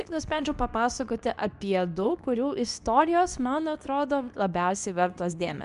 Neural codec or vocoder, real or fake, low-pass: codec, 24 kHz, 0.9 kbps, WavTokenizer, medium speech release version 2; fake; 10.8 kHz